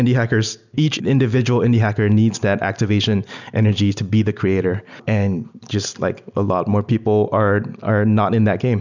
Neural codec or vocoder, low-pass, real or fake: none; 7.2 kHz; real